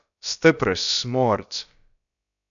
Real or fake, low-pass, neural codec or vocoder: fake; 7.2 kHz; codec, 16 kHz, about 1 kbps, DyCAST, with the encoder's durations